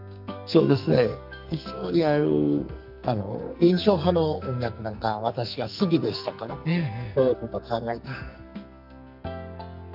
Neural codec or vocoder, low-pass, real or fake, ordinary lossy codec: codec, 44.1 kHz, 2.6 kbps, SNAC; 5.4 kHz; fake; none